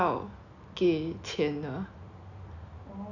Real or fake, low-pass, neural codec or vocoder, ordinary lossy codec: real; 7.2 kHz; none; none